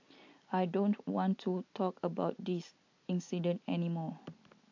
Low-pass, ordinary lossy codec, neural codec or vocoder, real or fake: 7.2 kHz; AAC, 48 kbps; none; real